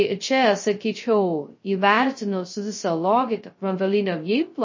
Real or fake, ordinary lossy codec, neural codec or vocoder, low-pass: fake; MP3, 32 kbps; codec, 16 kHz, 0.2 kbps, FocalCodec; 7.2 kHz